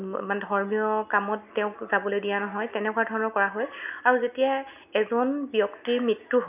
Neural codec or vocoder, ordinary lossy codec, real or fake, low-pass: none; none; real; 3.6 kHz